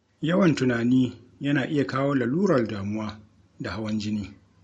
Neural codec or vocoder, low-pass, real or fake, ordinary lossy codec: none; 14.4 kHz; real; MP3, 48 kbps